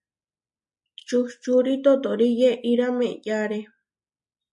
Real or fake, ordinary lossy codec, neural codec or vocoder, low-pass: real; MP3, 48 kbps; none; 10.8 kHz